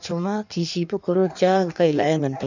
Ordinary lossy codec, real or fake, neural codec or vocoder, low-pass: none; fake; codec, 16 kHz in and 24 kHz out, 1.1 kbps, FireRedTTS-2 codec; 7.2 kHz